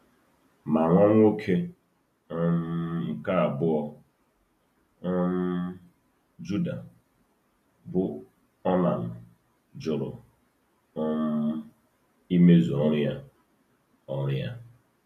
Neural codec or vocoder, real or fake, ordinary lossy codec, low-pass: none; real; none; 14.4 kHz